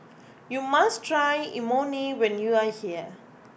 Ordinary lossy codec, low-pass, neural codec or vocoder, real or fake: none; none; none; real